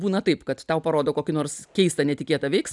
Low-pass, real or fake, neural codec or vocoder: 10.8 kHz; real; none